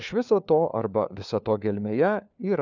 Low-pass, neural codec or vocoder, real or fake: 7.2 kHz; codec, 16 kHz, 4 kbps, FunCodec, trained on LibriTTS, 50 frames a second; fake